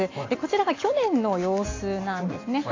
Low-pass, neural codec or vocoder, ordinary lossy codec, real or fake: 7.2 kHz; none; AAC, 32 kbps; real